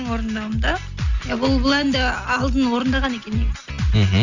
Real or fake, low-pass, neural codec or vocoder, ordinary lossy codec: real; 7.2 kHz; none; none